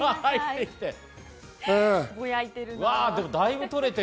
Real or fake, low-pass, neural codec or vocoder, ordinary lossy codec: real; none; none; none